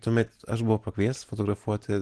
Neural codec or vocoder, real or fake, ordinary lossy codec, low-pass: none; real; Opus, 16 kbps; 10.8 kHz